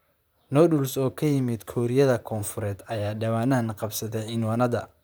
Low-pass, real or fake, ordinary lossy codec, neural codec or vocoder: none; fake; none; vocoder, 44.1 kHz, 128 mel bands, Pupu-Vocoder